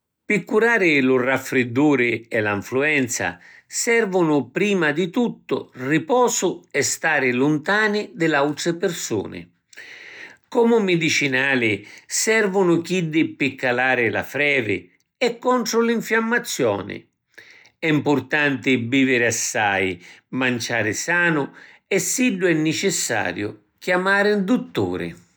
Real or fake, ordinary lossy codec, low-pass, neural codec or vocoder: real; none; none; none